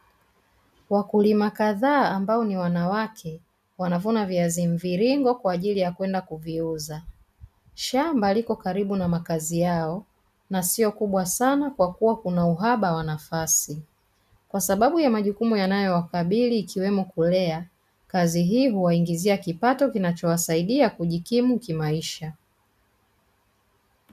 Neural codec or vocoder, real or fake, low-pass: none; real; 14.4 kHz